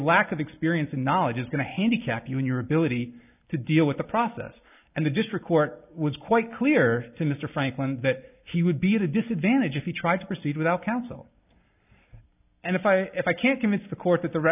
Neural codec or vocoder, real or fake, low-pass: none; real; 3.6 kHz